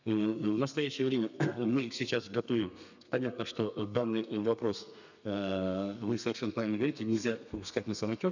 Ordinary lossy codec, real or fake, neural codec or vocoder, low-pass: none; fake; codec, 32 kHz, 1.9 kbps, SNAC; 7.2 kHz